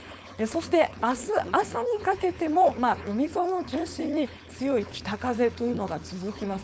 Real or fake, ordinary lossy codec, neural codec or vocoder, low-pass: fake; none; codec, 16 kHz, 4.8 kbps, FACodec; none